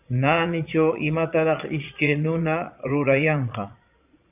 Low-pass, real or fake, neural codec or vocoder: 3.6 kHz; fake; vocoder, 22.05 kHz, 80 mel bands, Vocos